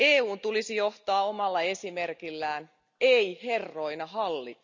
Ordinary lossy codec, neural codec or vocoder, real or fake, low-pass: none; none; real; 7.2 kHz